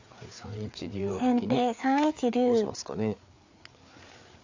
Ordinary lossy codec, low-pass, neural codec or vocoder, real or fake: AAC, 48 kbps; 7.2 kHz; codec, 16 kHz, 4 kbps, FreqCodec, larger model; fake